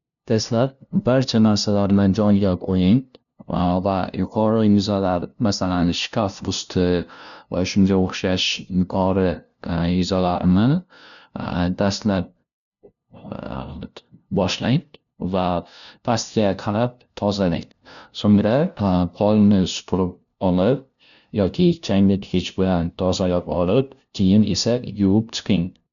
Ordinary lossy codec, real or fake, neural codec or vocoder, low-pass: none; fake; codec, 16 kHz, 0.5 kbps, FunCodec, trained on LibriTTS, 25 frames a second; 7.2 kHz